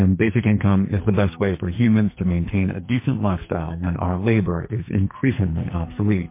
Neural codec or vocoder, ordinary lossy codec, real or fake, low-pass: codec, 44.1 kHz, 2.6 kbps, SNAC; MP3, 24 kbps; fake; 3.6 kHz